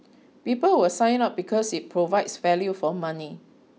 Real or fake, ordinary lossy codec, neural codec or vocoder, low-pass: real; none; none; none